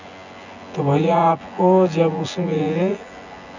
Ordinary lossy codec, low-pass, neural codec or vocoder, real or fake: none; 7.2 kHz; vocoder, 24 kHz, 100 mel bands, Vocos; fake